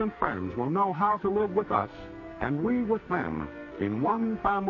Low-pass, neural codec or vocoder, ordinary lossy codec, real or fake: 7.2 kHz; codec, 44.1 kHz, 2.6 kbps, SNAC; MP3, 32 kbps; fake